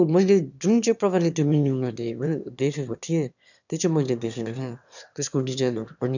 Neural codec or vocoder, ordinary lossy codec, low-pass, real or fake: autoencoder, 22.05 kHz, a latent of 192 numbers a frame, VITS, trained on one speaker; none; 7.2 kHz; fake